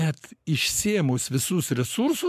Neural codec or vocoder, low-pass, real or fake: codec, 44.1 kHz, 7.8 kbps, Pupu-Codec; 14.4 kHz; fake